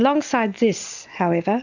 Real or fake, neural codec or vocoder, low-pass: real; none; 7.2 kHz